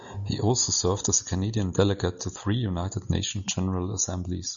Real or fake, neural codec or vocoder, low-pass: real; none; 7.2 kHz